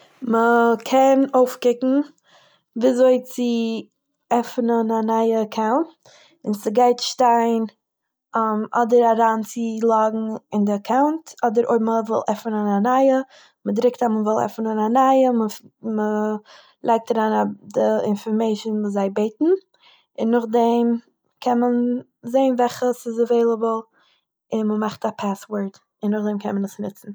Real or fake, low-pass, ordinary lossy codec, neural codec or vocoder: real; none; none; none